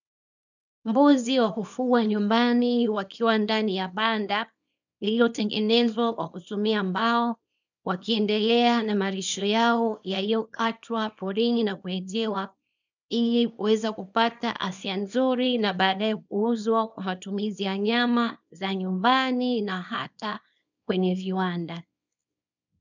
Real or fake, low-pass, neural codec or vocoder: fake; 7.2 kHz; codec, 24 kHz, 0.9 kbps, WavTokenizer, small release